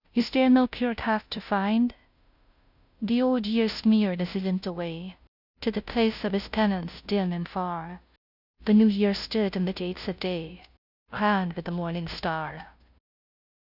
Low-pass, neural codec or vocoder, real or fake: 5.4 kHz; codec, 16 kHz, 0.5 kbps, FunCodec, trained on Chinese and English, 25 frames a second; fake